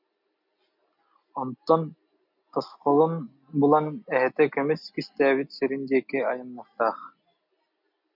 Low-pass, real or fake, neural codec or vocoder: 5.4 kHz; real; none